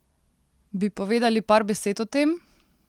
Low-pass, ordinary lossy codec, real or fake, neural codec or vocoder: 19.8 kHz; Opus, 24 kbps; real; none